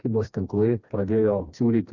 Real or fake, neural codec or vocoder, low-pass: fake; codec, 16 kHz, 2 kbps, FreqCodec, smaller model; 7.2 kHz